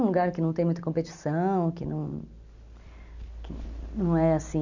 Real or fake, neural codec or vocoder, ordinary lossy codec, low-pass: real; none; none; 7.2 kHz